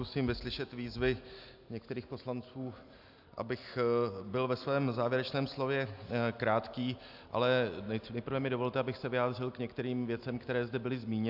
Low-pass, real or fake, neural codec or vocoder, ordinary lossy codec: 5.4 kHz; real; none; AAC, 48 kbps